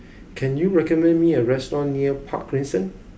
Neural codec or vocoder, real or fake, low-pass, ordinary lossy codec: none; real; none; none